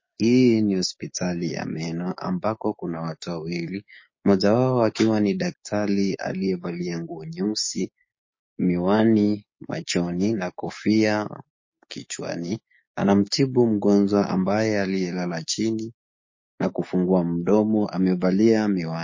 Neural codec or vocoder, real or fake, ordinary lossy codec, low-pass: codec, 16 kHz, 6 kbps, DAC; fake; MP3, 32 kbps; 7.2 kHz